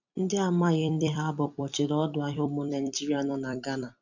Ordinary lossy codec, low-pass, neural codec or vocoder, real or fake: none; 7.2 kHz; vocoder, 44.1 kHz, 128 mel bands every 256 samples, BigVGAN v2; fake